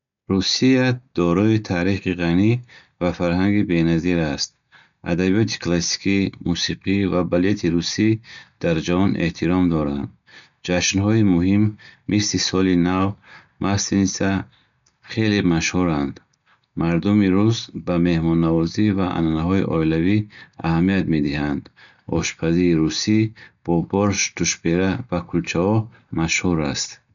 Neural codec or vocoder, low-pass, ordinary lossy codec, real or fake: none; 7.2 kHz; none; real